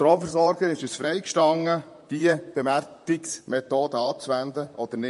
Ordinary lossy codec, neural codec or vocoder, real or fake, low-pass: MP3, 48 kbps; vocoder, 44.1 kHz, 128 mel bands, Pupu-Vocoder; fake; 14.4 kHz